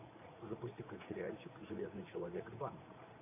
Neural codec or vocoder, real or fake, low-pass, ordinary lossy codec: vocoder, 44.1 kHz, 80 mel bands, Vocos; fake; 3.6 kHz; AAC, 24 kbps